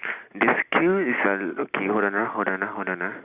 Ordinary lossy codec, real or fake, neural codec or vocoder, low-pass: Opus, 64 kbps; real; none; 3.6 kHz